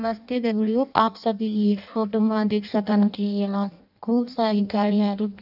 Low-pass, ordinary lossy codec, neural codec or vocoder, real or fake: 5.4 kHz; none; codec, 16 kHz in and 24 kHz out, 0.6 kbps, FireRedTTS-2 codec; fake